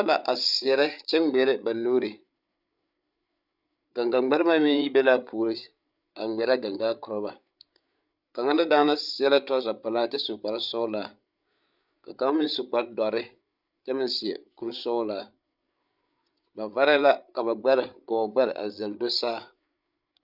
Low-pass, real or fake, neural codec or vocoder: 5.4 kHz; fake; codec, 16 kHz, 8 kbps, FreqCodec, larger model